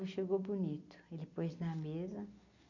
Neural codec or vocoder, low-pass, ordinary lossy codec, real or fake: none; 7.2 kHz; none; real